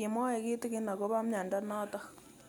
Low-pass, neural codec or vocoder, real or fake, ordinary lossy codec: none; none; real; none